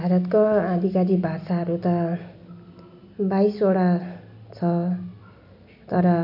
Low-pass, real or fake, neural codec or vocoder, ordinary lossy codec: 5.4 kHz; real; none; none